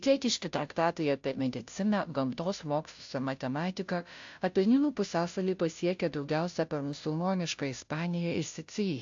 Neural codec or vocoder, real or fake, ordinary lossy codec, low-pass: codec, 16 kHz, 0.5 kbps, FunCodec, trained on Chinese and English, 25 frames a second; fake; AAC, 64 kbps; 7.2 kHz